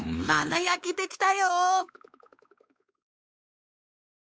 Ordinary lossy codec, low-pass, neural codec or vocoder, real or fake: none; none; codec, 16 kHz, 2 kbps, X-Codec, WavLM features, trained on Multilingual LibriSpeech; fake